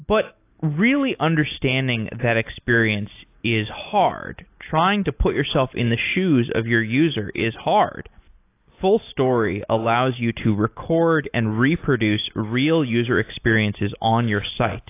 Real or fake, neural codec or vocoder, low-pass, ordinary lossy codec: real; none; 3.6 kHz; AAC, 24 kbps